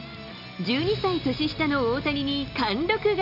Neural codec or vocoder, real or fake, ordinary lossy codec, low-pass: none; real; none; 5.4 kHz